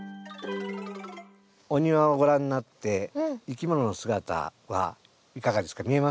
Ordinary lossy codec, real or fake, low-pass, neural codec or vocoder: none; real; none; none